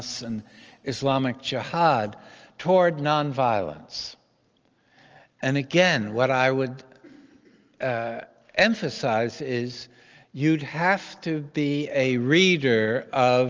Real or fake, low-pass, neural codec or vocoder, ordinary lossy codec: real; 7.2 kHz; none; Opus, 24 kbps